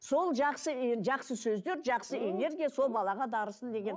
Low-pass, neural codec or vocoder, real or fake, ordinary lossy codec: none; none; real; none